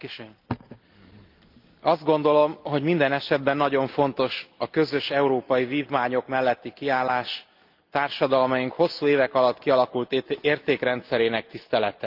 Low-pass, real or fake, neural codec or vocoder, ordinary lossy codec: 5.4 kHz; real; none; Opus, 32 kbps